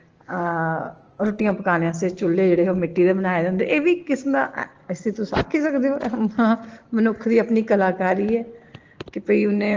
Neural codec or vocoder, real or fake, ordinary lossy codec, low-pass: none; real; Opus, 16 kbps; 7.2 kHz